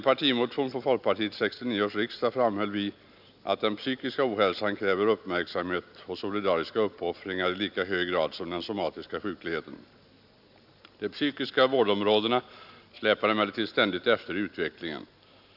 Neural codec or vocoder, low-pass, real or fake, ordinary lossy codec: none; 5.4 kHz; real; none